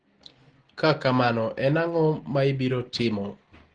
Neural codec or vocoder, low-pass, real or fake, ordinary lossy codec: none; 9.9 kHz; real; Opus, 16 kbps